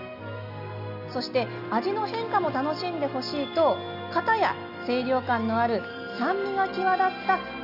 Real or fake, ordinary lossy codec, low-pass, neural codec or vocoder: real; none; 5.4 kHz; none